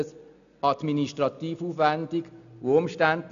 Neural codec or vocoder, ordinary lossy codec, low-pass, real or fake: none; none; 7.2 kHz; real